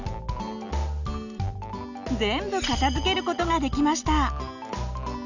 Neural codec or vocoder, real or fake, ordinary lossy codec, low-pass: none; real; none; 7.2 kHz